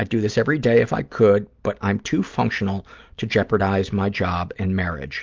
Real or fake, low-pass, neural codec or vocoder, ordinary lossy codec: real; 7.2 kHz; none; Opus, 32 kbps